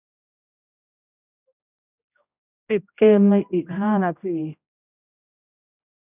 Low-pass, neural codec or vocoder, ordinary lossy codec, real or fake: 3.6 kHz; codec, 16 kHz, 0.5 kbps, X-Codec, HuBERT features, trained on general audio; none; fake